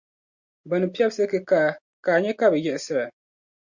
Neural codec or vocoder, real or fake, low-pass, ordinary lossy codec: none; real; 7.2 kHz; Opus, 64 kbps